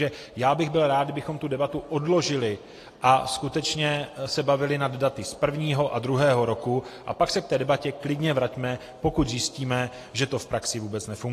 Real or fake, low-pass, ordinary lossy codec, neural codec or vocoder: real; 14.4 kHz; AAC, 48 kbps; none